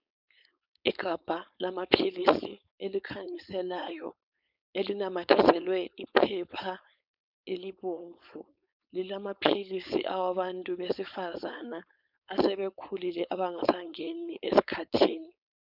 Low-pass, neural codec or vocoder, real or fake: 5.4 kHz; codec, 16 kHz, 4.8 kbps, FACodec; fake